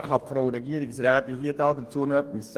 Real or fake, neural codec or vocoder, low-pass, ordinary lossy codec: fake; codec, 44.1 kHz, 2.6 kbps, DAC; 14.4 kHz; Opus, 24 kbps